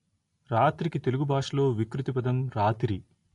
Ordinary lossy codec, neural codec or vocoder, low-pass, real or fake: AAC, 48 kbps; none; 10.8 kHz; real